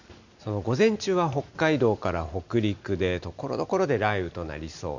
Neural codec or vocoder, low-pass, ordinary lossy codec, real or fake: none; 7.2 kHz; none; real